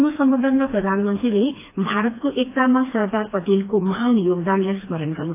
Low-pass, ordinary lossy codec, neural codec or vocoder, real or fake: 3.6 kHz; AAC, 24 kbps; codec, 16 kHz, 2 kbps, FreqCodec, smaller model; fake